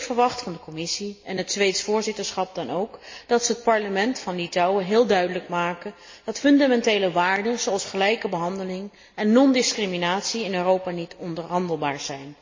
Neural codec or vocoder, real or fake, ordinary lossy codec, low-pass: none; real; MP3, 32 kbps; 7.2 kHz